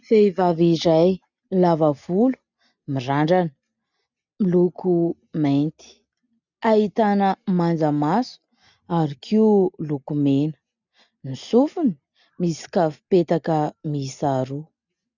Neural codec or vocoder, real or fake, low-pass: none; real; 7.2 kHz